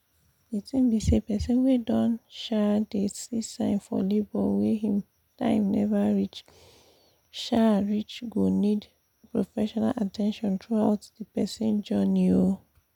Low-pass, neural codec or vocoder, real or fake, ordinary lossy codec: 19.8 kHz; none; real; none